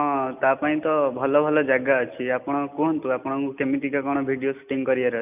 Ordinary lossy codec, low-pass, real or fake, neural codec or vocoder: none; 3.6 kHz; real; none